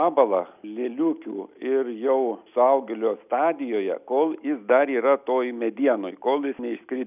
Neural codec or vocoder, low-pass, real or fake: none; 3.6 kHz; real